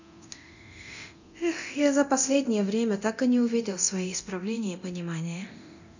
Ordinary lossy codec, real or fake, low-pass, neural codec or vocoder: none; fake; 7.2 kHz; codec, 24 kHz, 0.9 kbps, DualCodec